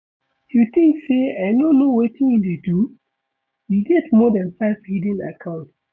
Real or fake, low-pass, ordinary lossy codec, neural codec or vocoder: fake; none; none; codec, 16 kHz, 6 kbps, DAC